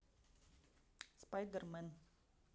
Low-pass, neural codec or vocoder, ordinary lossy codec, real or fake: none; none; none; real